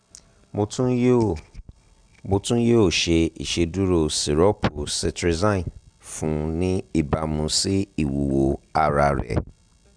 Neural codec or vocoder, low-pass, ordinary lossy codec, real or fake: none; 9.9 kHz; Opus, 64 kbps; real